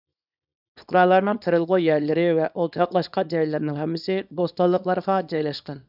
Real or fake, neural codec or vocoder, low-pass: fake; codec, 24 kHz, 0.9 kbps, WavTokenizer, small release; 5.4 kHz